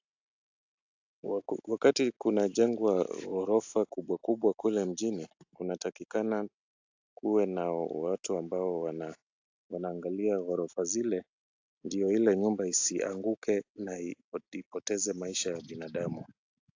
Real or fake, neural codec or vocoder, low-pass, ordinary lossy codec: real; none; 7.2 kHz; AAC, 48 kbps